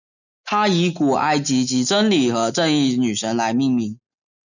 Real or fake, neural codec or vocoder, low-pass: real; none; 7.2 kHz